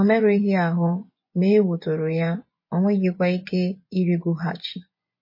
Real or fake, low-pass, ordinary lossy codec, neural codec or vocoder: fake; 5.4 kHz; MP3, 24 kbps; vocoder, 24 kHz, 100 mel bands, Vocos